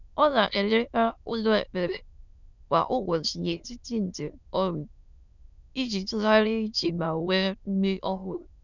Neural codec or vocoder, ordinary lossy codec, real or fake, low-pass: autoencoder, 22.05 kHz, a latent of 192 numbers a frame, VITS, trained on many speakers; none; fake; 7.2 kHz